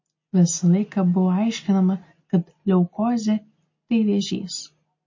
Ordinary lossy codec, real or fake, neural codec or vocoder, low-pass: MP3, 32 kbps; real; none; 7.2 kHz